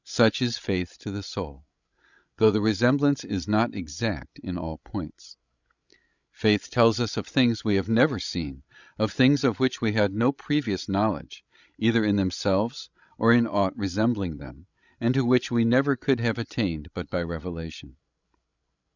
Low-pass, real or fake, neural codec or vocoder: 7.2 kHz; fake; codec, 16 kHz, 16 kbps, FreqCodec, larger model